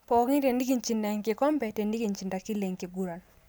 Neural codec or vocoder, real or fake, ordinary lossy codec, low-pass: none; real; none; none